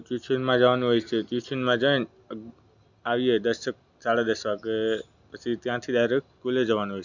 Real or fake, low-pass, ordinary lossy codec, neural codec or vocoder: real; 7.2 kHz; none; none